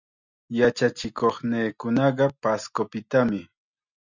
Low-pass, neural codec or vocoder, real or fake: 7.2 kHz; none; real